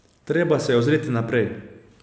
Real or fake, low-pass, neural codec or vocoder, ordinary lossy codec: real; none; none; none